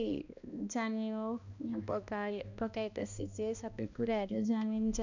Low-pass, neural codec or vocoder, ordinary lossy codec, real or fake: 7.2 kHz; codec, 16 kHz, 1 kbps, X-Codec, HuBERT features, trained on balanced general audio; none; fake